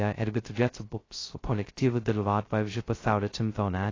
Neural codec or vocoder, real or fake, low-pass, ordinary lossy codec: codec, 16 kHz, 0.2 kbps, FocalCodec; fake; 7.2 kHz; AAC, 32 kbps